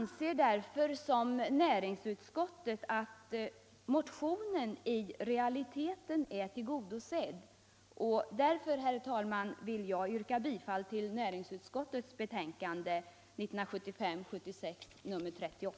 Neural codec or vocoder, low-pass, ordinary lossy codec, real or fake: none; none; none; real